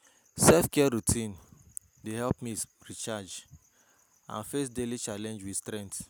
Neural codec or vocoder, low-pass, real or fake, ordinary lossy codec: none; none; real; none